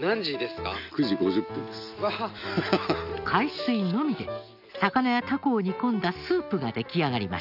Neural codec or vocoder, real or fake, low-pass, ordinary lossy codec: none; real; 5.4 kHz; none